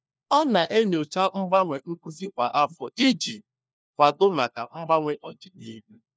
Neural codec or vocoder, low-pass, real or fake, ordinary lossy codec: codec, 16 kHz, 1 kbps, FunCodec, trained on LibriTTS, 50 frames a second; none; fake; none